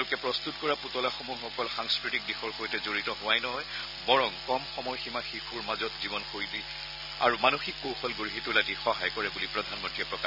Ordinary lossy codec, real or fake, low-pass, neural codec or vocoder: none; real; 5.4 kHz; none